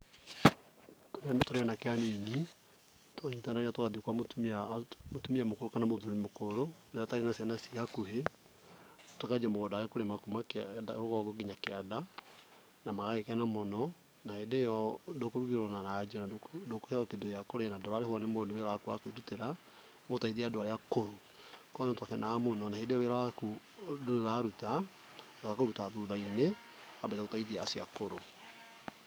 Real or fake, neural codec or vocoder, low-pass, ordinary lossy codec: fake; codec, 44.1 kHz, 7.8 kbps, DAC; none; none